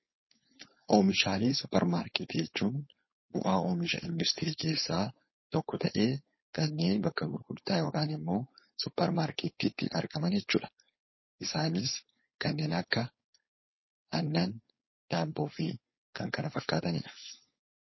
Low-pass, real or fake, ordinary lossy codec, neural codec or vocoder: 7.2 kHz; fake; MP3, 24 kbps; codec, 16 kHz, 4.8 kbps, FACodec